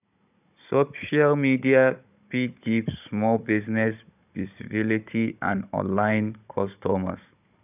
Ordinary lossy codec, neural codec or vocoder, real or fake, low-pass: none; codec, 16 kHz, 16 kbps, FunCodec, trained on Chinese and English, 50 frames a second; fake; 3.6 kHz